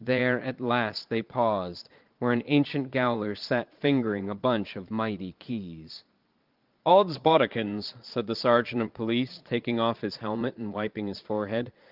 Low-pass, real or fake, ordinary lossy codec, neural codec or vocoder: 5.4 kHz; fake; Opus, 32 kbps; vocoder, 22.05 kHz, 80 mel bands, Vocos